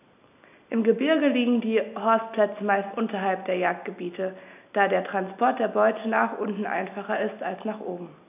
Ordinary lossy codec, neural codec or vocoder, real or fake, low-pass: AAC, 32 kbps; none; real; 3.6 kHz